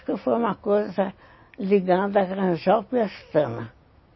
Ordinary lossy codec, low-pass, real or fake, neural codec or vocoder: MP3, 24 kbps; 7.2 kHz; real; none